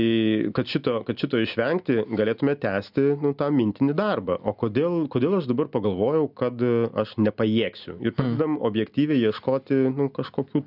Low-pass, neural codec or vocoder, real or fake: 5.4 kHz; none; real